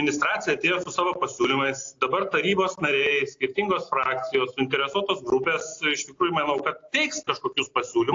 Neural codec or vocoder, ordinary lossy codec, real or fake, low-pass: none; AAC, 48 kbps; real; 7.2 kHz